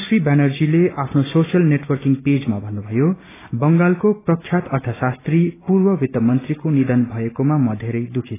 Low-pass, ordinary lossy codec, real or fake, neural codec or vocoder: 3.6 kHz; AAC, 16 kbps; real; none